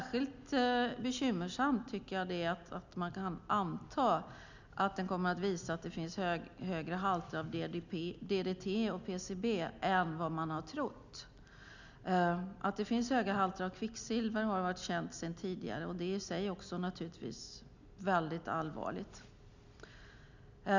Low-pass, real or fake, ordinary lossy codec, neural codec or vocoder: 7.2 kHz; real; none; none